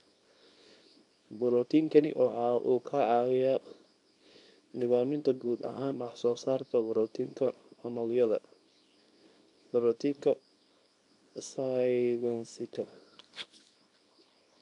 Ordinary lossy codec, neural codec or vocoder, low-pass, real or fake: none; codec, 24 kHz, 0.9 kbps, WavTokenizer, small release; 10.8 kHz; fake